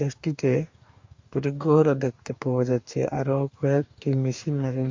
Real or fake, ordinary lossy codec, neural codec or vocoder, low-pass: fake; MP3, 48 kbps; codec, 44.1 kHz, 2.6 kbps, DAC; 7.2 kHz